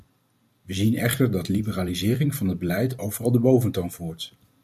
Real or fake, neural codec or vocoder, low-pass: fake; vocoder, 44.1 kHz, 128 mel bands every 256 samples, BigVGAN v2; 14.4 kHz